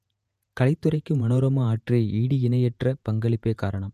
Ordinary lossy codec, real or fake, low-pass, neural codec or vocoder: none; fake; 14.4 kHz; vocoder, 48 kHz, 128 mel bands, Vocos